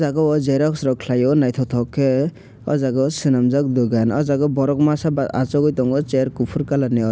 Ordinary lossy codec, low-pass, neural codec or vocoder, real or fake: none; none; none; real